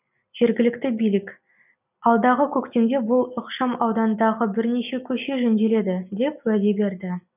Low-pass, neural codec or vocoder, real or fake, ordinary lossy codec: 3.6 kHz; none; real; none